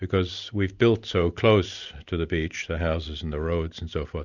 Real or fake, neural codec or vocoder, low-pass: real; none; 7.2 kHz